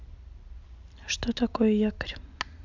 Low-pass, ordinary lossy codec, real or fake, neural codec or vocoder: 7.2 kHz; AAC, 48 kbps; real; none